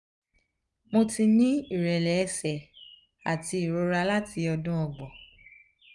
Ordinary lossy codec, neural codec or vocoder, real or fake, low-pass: none; none; real; 10.8 kHz